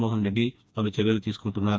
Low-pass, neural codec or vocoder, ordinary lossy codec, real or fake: none; codec, 16 kHz, 2 kbps, FreqCodec, smaller model; none; fake